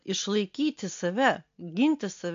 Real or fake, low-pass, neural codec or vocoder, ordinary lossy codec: real; 7.2 kHz; none; MP3, 48 kbps